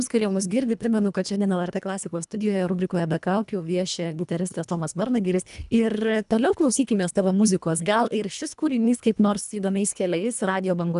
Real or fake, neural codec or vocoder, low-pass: fake; codec, 24 kHz, 1.5 kbps, HILCodec; 10.8 kHz